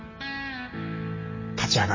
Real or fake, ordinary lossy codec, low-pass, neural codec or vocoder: real; MP3, 32 kbps; 7.2 kHz; none